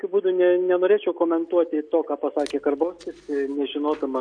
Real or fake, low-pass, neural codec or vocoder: real; 9.9 kHz; none